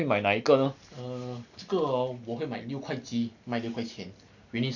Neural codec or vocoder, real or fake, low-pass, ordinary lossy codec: none; real; 7.2 kHz; none